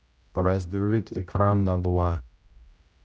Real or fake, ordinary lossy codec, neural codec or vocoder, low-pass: fake; none; codec, 16 kHz, 0.5 kbps, X-Codec, HuBERT features, trained on general audio; none